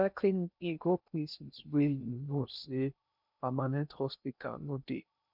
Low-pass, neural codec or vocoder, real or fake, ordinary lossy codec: 5.4 kHz; codec, 16 kHz in and 24 kHz out, 0.6 kbps, FocalCodec, streaming, 2048 codes; fake; none